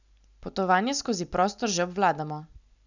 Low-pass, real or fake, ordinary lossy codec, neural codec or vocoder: 7.2 kHz; real; none; none